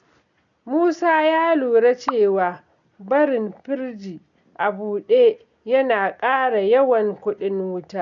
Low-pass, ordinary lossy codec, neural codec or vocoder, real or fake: 7.2 kHz; none; none; real